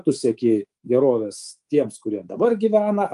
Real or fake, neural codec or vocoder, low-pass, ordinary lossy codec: fake; codec, 24 kHz, 3.1 kbps, DualCodec; 10.8 kHz; Opus, 32 kbps